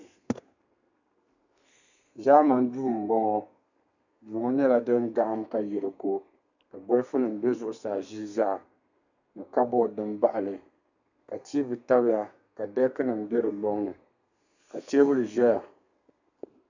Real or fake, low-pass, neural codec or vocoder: fake; 7.2 kHz; codec, 32 kHz, 1.9 kbps, SNAC